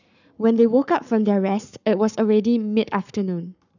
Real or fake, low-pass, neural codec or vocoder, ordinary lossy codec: fake; 7.2 kHz; codec, 44.1 kHz, 7.8 kbps, Pupu-Codec; none